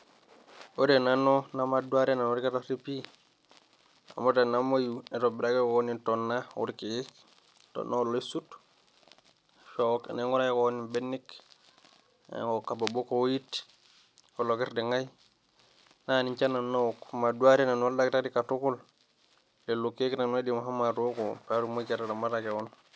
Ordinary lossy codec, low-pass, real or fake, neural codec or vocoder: none; none; real; none